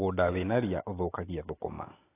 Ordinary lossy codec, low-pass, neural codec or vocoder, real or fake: AAC, 16 kbps; 3.6 kHz; none; real